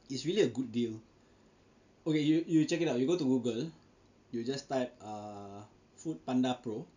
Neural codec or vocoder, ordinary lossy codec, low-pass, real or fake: none; none; 7.2 kHz; real